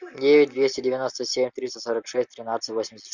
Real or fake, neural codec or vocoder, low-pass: real; none; 7.2 kHz